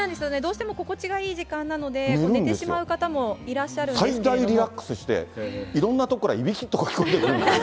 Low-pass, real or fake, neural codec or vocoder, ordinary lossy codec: none; real; none; none